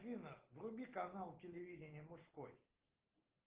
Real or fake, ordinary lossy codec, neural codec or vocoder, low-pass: real; Opus, 16 kbps; none; 3.6 kHz